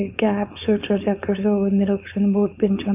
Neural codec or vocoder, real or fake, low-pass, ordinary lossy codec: codec, 16 kHz, 4.8 kbps, FACodec; fake; 3.6 kHz; none